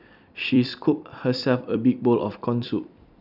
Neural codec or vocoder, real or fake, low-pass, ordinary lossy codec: vocoder, 44.1 kHz, 128 mel bands every 256 samples, BigVGAN v2; fake; 5.4 kHz; none